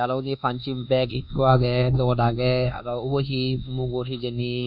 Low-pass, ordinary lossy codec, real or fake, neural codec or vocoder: 5.4 kHz; none; fake; codec, 24 kHz, 1.2 kbps, DualCodec